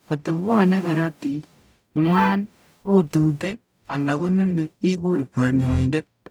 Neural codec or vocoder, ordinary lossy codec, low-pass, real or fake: codec, 44.1 kHz, 0.9 kbps, DAC; none; none; fake